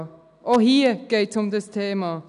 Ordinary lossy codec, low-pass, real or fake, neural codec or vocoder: none; 10.8 kHz; real; none